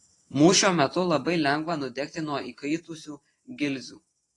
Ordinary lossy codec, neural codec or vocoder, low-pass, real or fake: AAC, 32 kbps; vocoder, 44.1 kHz, 128 mel bands every 512 samples, BigVGAN v2; 10.8 kHz; fake